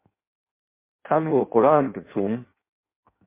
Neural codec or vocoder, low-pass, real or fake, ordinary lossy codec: codec, 16 kHz in and 24 kHz out, 0.6 kbps, FireRedTTS-2 codec; 3.6 kHz; fake; MP3, 24 kbps